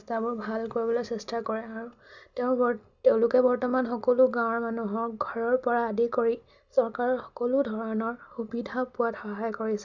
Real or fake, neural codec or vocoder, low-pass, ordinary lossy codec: real; none; 7.2 kHz; none